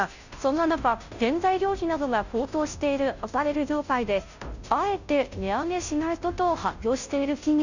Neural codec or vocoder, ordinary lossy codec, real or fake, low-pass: codec, 16 kHz, 0.5 kbps, FunCodec, trained on Chinese and English, 25 frames a second; none; fake; 7.2 kHz